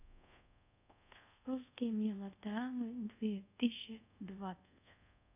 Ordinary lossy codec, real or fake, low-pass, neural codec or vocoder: none; fake; 3.6 kHz; codec, 24 kHz, 0.5 kbps, DualCodec